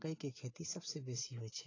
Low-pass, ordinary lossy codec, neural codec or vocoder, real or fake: 7.2 kHz; AAC, 32 kbps; none; real